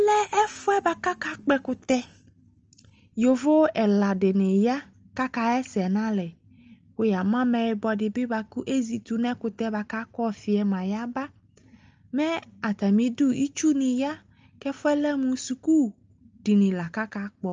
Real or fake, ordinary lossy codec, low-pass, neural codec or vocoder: real; Opus, 24 kbps; 7.2 kHz; none